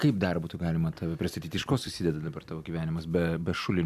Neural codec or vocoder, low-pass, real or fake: none; 14.4 kHz; real